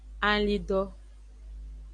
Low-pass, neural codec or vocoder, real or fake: 9.9 kHz; none; real